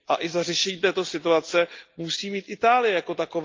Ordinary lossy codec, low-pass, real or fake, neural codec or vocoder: Opus, 32 kbps; 7.2 kHz; real; none